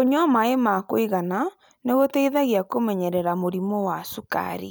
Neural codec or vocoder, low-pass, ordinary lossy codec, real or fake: none; none; none; real